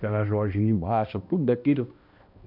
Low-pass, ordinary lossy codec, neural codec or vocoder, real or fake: 5.4 kHz; MP3, 48 kbps; codec, 16 kHz, 1 kbps, X-Codec, HuBERT features, trained on balanced general audio; fake